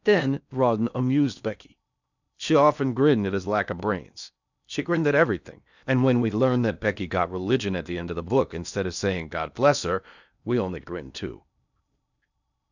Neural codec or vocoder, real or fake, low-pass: codec, 16 kHz in and 24 kHz out, 0.8 kbps, FocalCodec, streaming, 65536 codes; fake; 7.2 kHz